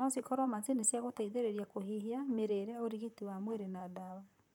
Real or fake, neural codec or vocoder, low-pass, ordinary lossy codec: fake; vocoder, 44.1 kHz, 128 mel bands, Pupu-Vocoder; 19.8 kHz; none